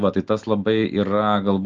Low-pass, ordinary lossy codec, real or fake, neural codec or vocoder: 7.2 kHz; Opus, 32 kbps; real; none